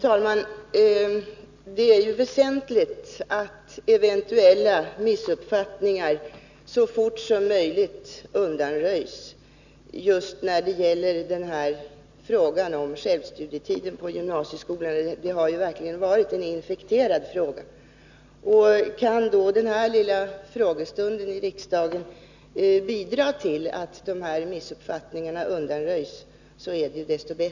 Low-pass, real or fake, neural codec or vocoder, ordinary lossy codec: 7.2 kHz; real; none; none